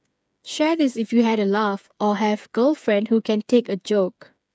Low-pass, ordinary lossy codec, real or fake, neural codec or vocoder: none; none; fake; codec, 16 kHz, 8 kbps, FreqCodec, smaller model